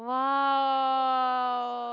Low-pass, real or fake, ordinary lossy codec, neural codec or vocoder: 7.2 kHz; real; Opus, 64 kbps; none